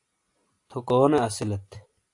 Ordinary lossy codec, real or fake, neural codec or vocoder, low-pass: AAC, 64 kbps; real; none; 10.8 kHz